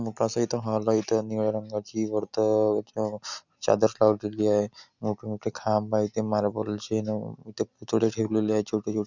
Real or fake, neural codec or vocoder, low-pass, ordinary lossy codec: real; none; 7.2 kHz; none